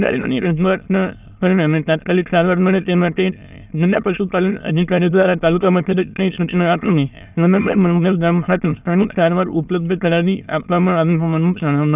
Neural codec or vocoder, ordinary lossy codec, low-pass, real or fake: autoencoder, 22.05 kHz, a latent of 192 numbers a frame, VITS, trained on many speakers; none; 3.6 kHz; fake